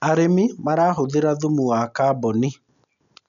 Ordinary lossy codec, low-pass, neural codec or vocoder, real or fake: none; 7.2 kHz; none; real